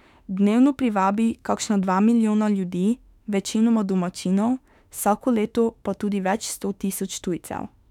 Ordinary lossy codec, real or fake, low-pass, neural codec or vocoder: none; fake; 19.8 kHz; autoencoder, 48 kHz, 32 numbers a frame, DAC-VAE, trained on Japanese speech